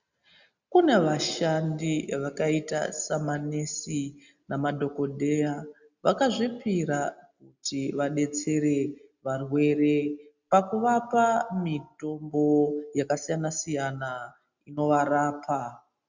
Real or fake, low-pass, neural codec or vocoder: real; 7.2 kHz; none